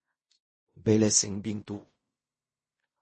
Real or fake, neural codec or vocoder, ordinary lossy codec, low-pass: fake; codec, 16 kHz in and 24 kHz out, 0.4 kbps, LongCat-Audio-Codec, fine tuned four codebook decoder; MP3, 32 kbps; 10.8 kHz